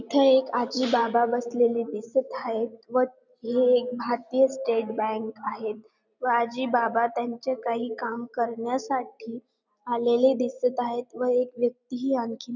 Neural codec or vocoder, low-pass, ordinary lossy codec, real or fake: none; 7.2 kHz; none; real